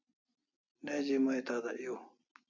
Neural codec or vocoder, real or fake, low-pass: none; real; 7.2 kHz